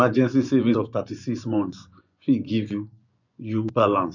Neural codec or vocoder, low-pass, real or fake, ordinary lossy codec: vocoder, 24 kHz, 100 mel bands, Vocos; 7.2 kHz; fake; none